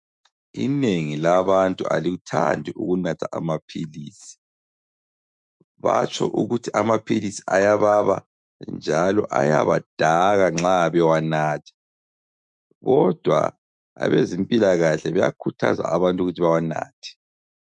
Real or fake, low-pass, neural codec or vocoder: fake; 10.8 kHz; autoencoder, 48 kHz, 128 numbers a frame, DAC-VAE, trained on Japanese speech